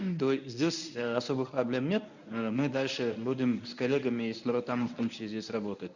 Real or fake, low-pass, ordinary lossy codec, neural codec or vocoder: fake; 7.2 kHz; none; codec, 24 kHz, 0.9 kbps, WavTokenizer, medium speech release version 1